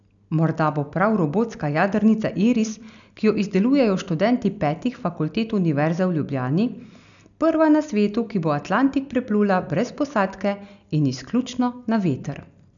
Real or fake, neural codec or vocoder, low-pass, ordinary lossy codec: real; none; 7.2 kHz; none